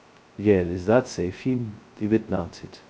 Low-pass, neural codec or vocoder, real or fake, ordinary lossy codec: none; codec, 16 kHz, 0.2 kbps, FocalCodec; fake; none